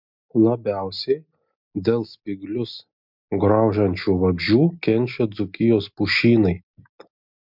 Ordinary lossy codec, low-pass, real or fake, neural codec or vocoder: MP3, 48 kbps; 5.4 kHz; real; none